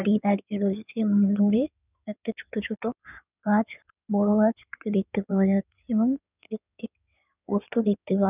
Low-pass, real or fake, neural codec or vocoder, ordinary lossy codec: 3.6 kHz; fake; codec, 16 kHz in and 24 kHz out, 2.2 kbps, FireRedTTS-2 codec; none